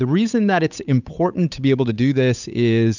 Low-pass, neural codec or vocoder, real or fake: 7.2 kHz; codec, 16 kHz, 8 kbps, FunCodec, trained on Chinese and English, 25 frames a second; fake